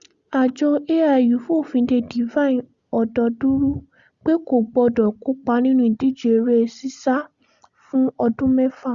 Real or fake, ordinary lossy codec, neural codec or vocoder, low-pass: real; Opus, 64 kbps; none; 7.2 kHz